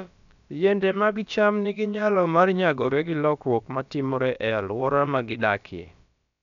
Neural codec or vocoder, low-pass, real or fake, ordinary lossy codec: codec, 16 kHz, about 1 kbps, DyCAST, with the encoder's durations; 7.2 kHz; fake; none